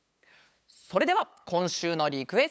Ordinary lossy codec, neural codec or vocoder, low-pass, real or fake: none; codec, 16 kHz, 8 kbps, FunCodec, trained on LibriTTS, 25 frames a second; none; fake